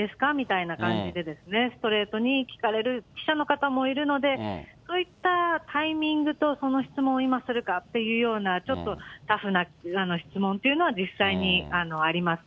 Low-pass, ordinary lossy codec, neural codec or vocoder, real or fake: none; none; none; real